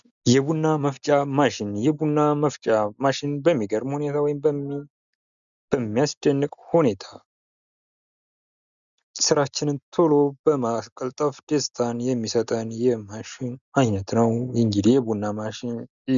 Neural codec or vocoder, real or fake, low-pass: none; real; 7.2 kHz